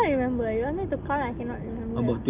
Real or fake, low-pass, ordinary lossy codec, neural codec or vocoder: real; 3.6 kHz; Opus, 24 kbps; none